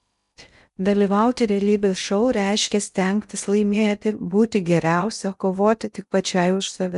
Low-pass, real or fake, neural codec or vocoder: 10.8 kHz; fake; codec, 16 kHz in and 24 kHz out, 0.6 kbps, FocalCodec, streaming, 2048 codes